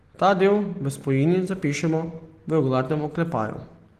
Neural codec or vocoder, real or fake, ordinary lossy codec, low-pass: none; real; Opus, 16 kbps; 14.4 kHz